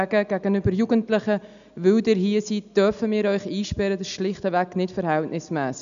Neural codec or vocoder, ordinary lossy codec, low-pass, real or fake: none; none; 7.2 kHz; real